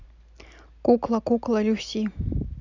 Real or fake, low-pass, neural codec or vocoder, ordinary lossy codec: real; 7.2 kHz; none; none